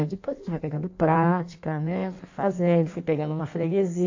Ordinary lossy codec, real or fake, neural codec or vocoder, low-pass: AAC, 48 kbps; fake; codec, 16 kHz in and 24 kHz out, 1.1 kbps, FireRedTTS-2 codec; 7.2 kHz